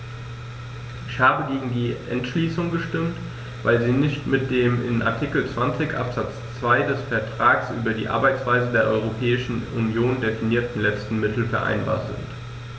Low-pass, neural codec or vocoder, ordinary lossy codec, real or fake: none; none; none; real